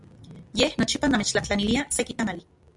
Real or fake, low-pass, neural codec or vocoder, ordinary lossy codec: real; 10.8 kHz; none; MP3, 96 kbps